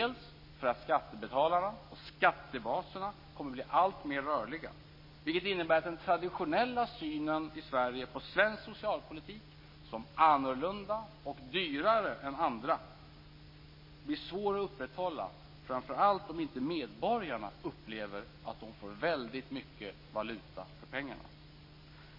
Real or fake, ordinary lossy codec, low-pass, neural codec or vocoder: real; MP3, 24 kbps; 5.4 kHz; none